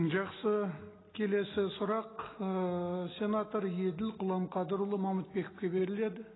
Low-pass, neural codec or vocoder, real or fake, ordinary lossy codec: 7.2 kHz; none; real; AAC, 16 kbps